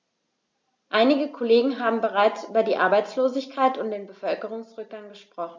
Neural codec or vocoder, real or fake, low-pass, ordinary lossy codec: none; real; none; none